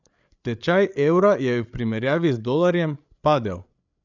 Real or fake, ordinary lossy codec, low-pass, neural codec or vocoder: fake; none; 7.2 kHz; codec, 16 kHz, 8 kbps, FreqCodec, larger model